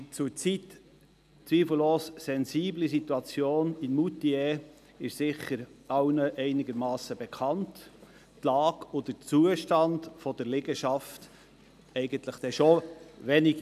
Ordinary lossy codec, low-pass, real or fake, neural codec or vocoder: none; 14.4 kHz; real; none